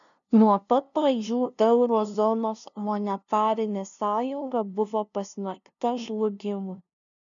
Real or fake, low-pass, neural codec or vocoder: fake; 7.2 kHz; codec, 16 kHz, 0.5 kbps, FunCodec, trained on LibriTTS, 25 frames a second